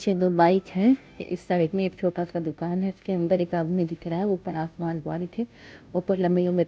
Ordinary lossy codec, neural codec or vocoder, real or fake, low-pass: none; codec, 16 kHz, 0.5 kbps, FunCodec, trained on Chinese and English, 25 frames a second; fake; none